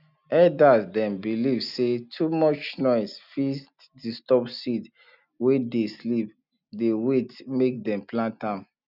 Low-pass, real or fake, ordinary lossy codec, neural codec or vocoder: 5.4 kHz; real; none; none